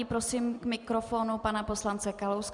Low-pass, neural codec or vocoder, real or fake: 10.8 kHz; none; real